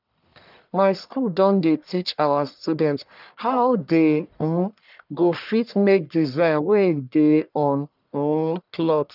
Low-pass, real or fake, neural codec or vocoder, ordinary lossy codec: 5.4 kHz; fake; codec, 44.1 kHz, 1.7 kbps, Pupu-Codec; none